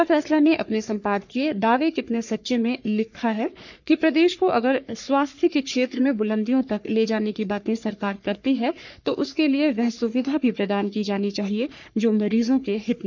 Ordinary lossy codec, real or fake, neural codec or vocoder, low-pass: none; fake; codec, 44.1 kHz, 3.4 kbps, Pupu-Codec; 7.2 kHz